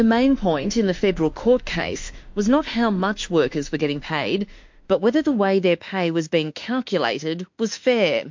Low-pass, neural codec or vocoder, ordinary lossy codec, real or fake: 7.2 kHz; autoencoder, 48 kHz, 32 numbers a frame, DAC-VAE, trained on Japanese speech; MP3, 48 kbps; fake